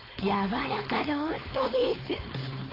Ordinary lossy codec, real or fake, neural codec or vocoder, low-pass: AAC, 24 kbps; fake; codec, 16 kHz, 4.8 kbps, FACodec; 5.4 kHz